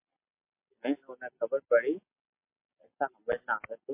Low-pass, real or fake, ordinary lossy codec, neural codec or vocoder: 3.6 kHz; real; MP3, 24 kbps; none